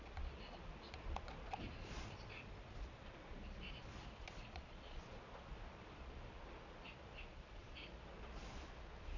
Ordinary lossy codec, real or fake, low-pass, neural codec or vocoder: none; fake; 7.2 kHz; vocoder, 22.05 kHz, 80 mel bands, Vocos